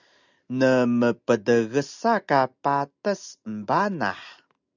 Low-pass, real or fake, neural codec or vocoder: 7.2 kHz; real; none